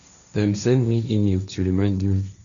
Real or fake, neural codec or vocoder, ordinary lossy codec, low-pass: fake; codec, 16 kHz, 1.1 kbps, Voila-Tokenizer; none; 7.2 kHz